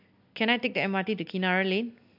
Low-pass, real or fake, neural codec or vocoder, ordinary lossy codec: 5.4 kHz; real; none; MP3, 48 kbps